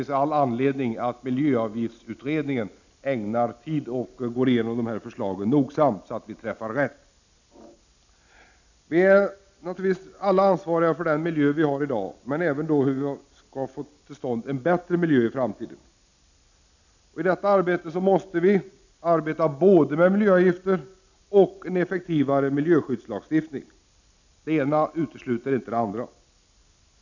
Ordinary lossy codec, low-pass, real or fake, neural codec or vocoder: none; 7.2 kHz; real; none